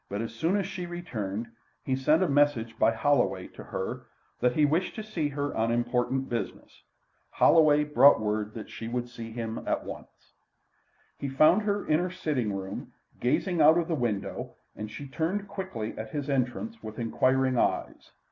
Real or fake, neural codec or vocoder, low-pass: real; none; 7.2 kHz